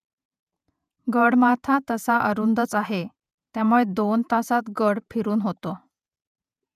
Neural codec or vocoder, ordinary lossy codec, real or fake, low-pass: vocoder, 48 kHz, 128 mel bands, Vocos; none; fake; 14.4 kHz